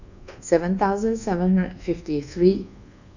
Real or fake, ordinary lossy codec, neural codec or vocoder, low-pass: fake; none; codec, 24 kHz, 1.2 kbps, DualCodec; 7.2 kHz